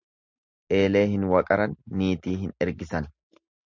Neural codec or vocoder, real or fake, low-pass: none; real; 7.2 kHz